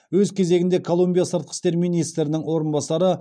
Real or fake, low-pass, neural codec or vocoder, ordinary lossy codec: real; 9.9 kHz; none; none